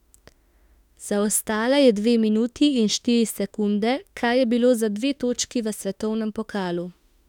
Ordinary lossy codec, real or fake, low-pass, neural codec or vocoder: none; fake; 19.8 kHz; autoencoder, 48 kHz, 32 numbers a frame, DAC-VAE, trained on Japanese speech